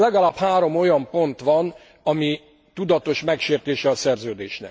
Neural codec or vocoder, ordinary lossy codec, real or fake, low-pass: none; none; real; none